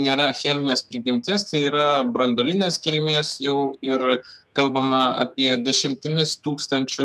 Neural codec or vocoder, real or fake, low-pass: codec, 32 kHz, 1.9 kbps, SNAC; fake; 14.4 kHz